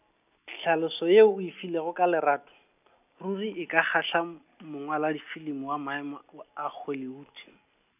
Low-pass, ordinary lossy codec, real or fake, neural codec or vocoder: 3.6 kHz; none; real; none